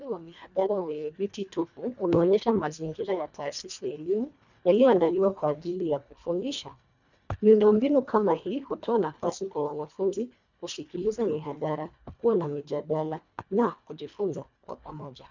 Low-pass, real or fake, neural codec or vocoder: 7.2 kHz; fake; codec, 24 kHz, 1.5 kbps, HILCodec